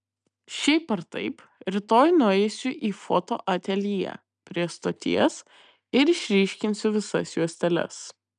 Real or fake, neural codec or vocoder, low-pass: real; none; 9.9 kHz